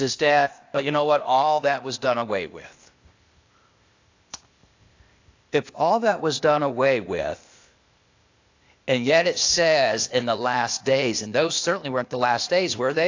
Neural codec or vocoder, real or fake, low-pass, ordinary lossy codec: codec, 16 kHz, 0.8 kbps, ZipCodec; fake; 7.2 kHz; AAC, 48 kbps